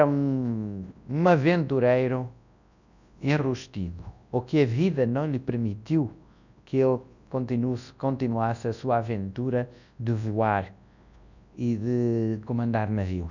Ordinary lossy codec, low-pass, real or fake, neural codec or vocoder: none; 7.2 kHz; fake; codec, 24 kHz, 0.9 kbps, WavTokenizer, large speech release